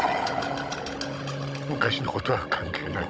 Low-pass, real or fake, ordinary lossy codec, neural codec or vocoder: none; fake; none; codec, 16 kHz, 16 kbps, FunCodec, trained on Chinese and English, 50 frames a second